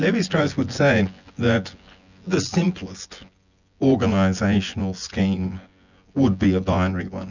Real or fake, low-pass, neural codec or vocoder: fake; 7.2 kHz; vocoder, 24 kHz, 100 mel bands, Vocos